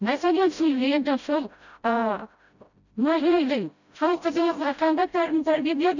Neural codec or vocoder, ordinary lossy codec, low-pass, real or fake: codec, 16 kHz, 0.5 kbps, FreqCodec, smaller model; none; 7.2 kHz; fake